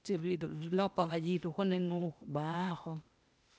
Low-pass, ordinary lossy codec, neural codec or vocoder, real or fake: none; none; codec, 16 kHz, 0.8 kbps, ZipCodec; fake